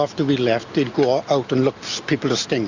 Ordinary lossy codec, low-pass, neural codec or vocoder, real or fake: Opus, 64 kbps; 7.2 kHz; none; real